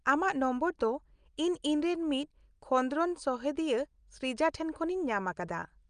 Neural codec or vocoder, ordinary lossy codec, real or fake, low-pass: none; Opus, 32 kbps; real; 9.9 kHz